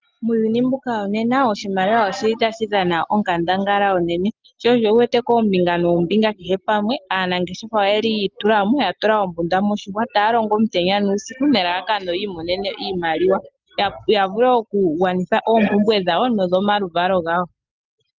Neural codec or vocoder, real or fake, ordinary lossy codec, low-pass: none; real; Opus, 32 kbps; 7.2 kHz